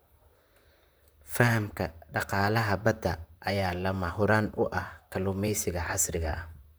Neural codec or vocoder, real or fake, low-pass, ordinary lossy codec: vocoder, 44.1 kHz, 128 mel bands, Pupu-Vocoder; fake; none; none